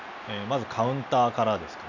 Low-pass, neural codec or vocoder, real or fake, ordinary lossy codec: 7.2 kHz; none; real; none